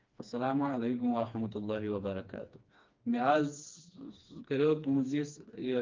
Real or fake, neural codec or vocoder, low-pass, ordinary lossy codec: fake; codec, 16 kHz, 2 kbps, FreqCodec, smaller model; 7.2 kHz; Opus, 24 kbps